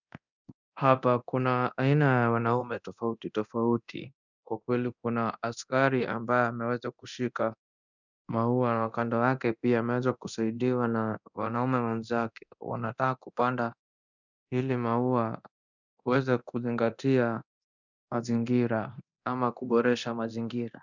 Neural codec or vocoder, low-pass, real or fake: codec, 24 kHz, 0.9 kbps, DualCodec; 7.2 kHz; fake